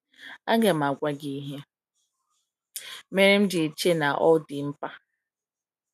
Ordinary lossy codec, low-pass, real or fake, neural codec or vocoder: none; 14.4 kHz; real; none